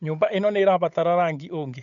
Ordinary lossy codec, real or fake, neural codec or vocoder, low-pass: none; fake; codec, 16 kHz, 8 kbps, FunCodec, trained on Chinese and English, 25 frames a second; 7.2 kHz